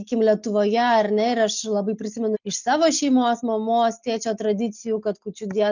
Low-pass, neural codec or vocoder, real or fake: 7.2 kHz; none; real